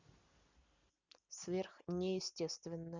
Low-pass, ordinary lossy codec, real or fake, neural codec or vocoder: 7.2 kHz; Opus, 64 kbps; real; none